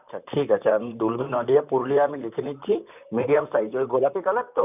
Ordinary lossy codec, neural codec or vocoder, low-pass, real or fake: none; vocoder, 44.1 kHz, 128 mel bands, Pupu-Vocoder; 3.6 kHz; fake